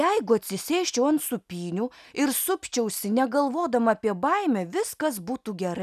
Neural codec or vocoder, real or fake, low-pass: none; real; 14.4 kHz